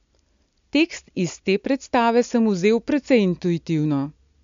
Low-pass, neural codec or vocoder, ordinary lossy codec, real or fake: 7.2 kHz; none; MP3, 64 kbps; real